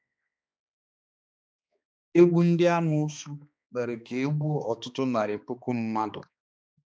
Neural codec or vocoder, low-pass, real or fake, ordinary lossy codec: codec, 16 kHz, 2 kbps, X-Codec, HuBERT features, trained on balanced general audio; none; fake; none